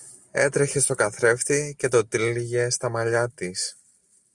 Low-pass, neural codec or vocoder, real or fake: 10.8 kHz; none; real